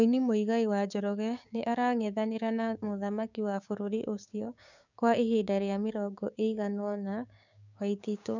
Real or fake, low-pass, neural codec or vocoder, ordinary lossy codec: fake; 7.2 kHz; codec, 16 kHz, 6 kbps, DAC; none